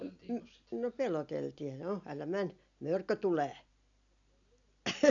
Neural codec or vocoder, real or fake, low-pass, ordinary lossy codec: none; real; 7.2 kHz; none